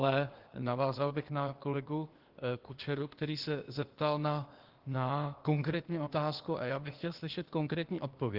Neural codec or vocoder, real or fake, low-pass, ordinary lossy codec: codec, 16 kHz, 0.8 kbps, ZipCodec; fake; 5.4 kHz; Opus, 16 kbps